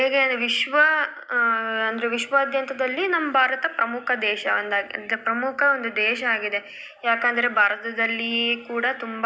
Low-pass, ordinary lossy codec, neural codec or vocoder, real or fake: none; none; none; real